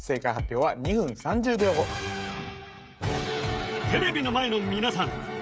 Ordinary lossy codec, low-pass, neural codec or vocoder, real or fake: none; none; codec, 16 kHz, 16 kbps, FreqCodec, smaller model; fake